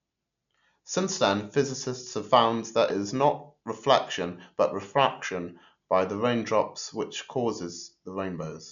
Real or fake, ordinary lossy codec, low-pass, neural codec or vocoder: real; none; 7.2 kHz; none